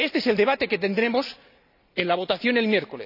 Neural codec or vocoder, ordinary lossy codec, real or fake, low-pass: none; none; real; 5.4 kHz